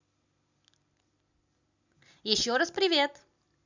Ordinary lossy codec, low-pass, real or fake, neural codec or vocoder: none; 7.2 kHz; real; none